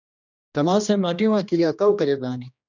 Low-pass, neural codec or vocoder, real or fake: 7.2 kHz; codec, 16 kHz, 1 kbps, X-Codec, HuBERT features, trained on general audio; fake